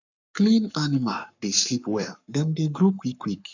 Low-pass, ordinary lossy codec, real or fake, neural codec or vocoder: 7.2 kHz; none; fake; codec, 44.1 kHz, 7.8 kbps, Pupu-Codec